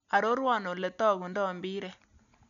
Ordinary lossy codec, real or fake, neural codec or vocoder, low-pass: none; real; none; 7.2 kHz